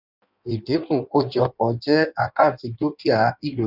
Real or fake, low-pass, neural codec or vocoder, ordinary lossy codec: fake; 5.4 kHz; codec, 16 kHz in and 24 kHz out, 1.1 kbps, FireRedTTS-2 codec; none